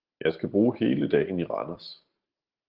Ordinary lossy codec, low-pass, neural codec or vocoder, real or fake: Opus, 16 kbps; 5.4 kHz; none; real